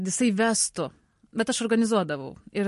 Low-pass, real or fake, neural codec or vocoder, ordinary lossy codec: 14.4 kHz; real; none; MP3, 48 kbps